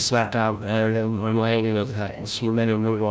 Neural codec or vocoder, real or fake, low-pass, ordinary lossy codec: codec, 16 kHz, 0.5 kbps, FreqCodec, larger model; fake; none; none